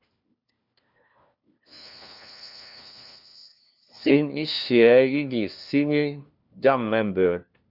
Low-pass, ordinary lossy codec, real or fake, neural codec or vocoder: 5.4 kHz; Opus, 64 kbps; fake; codec, 16 kHz, 1 kbps, FunCodec, trained on LibriTTS, 50 frames a second